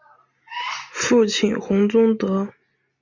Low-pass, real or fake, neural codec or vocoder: 7.2 kHz; real; none